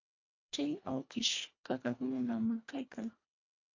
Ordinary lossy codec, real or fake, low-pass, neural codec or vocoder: MP3, 48 kbps; fake; 7.2 kHz; codec, 24 kHz, 1.5 kbps, HILCodec